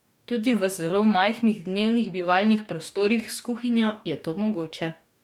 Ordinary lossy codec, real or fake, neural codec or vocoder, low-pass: none; fake; codec, 44.1 kHz, 2.6 kbps, DAC; 19.8 kHz